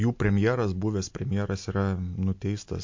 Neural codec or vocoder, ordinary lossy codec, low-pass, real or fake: none; MP3, 48 kbps; 7.2 kHz; real